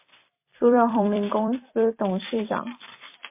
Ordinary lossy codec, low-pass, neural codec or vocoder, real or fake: MP3, 24 kbps; 3.6 kHz; vocoder, 44.1 kHz, 128 mel bands every 256 samples, BigVGAN v2; fake